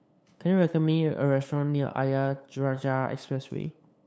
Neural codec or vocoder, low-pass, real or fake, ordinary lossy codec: codec, 16 kHz, 8 kbps, FunCodec, trained on LibriTTS, 25 frames a second; none; fake; none